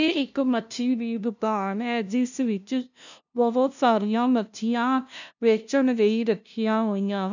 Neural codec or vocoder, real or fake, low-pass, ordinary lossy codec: codec, 16 kHz, 0.5 kbps, FunCodec, trained on LibriTTS, 25 frames a second; fake; 7.2 kHz; none